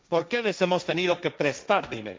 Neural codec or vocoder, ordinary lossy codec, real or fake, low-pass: codec, 16 kHz, 1.1 kbps, Voila-Tokenizer; none; fake; none